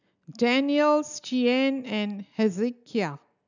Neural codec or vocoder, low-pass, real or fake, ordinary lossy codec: none; 7.2 kHz; real; none